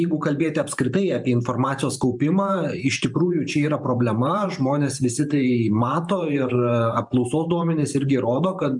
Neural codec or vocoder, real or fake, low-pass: vocoder, 44.1 kHz, 128 mel bands every 512 samples, BigVGAN v2; fake; 10.8 kHz